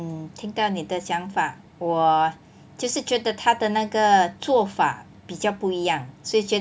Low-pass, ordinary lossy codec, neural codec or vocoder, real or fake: none; none; none; real